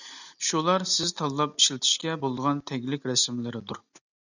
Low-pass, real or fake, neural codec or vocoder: 7.2 kHz; real; none